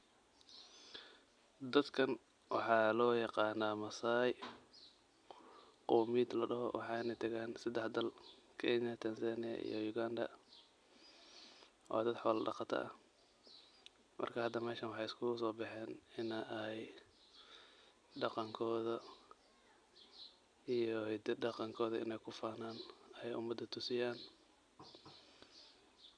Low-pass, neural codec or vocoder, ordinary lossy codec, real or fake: 9.9 kHz; none; none; real